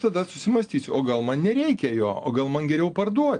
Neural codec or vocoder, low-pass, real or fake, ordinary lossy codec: none; 9.9 kHz; real; Opus, 24 kbps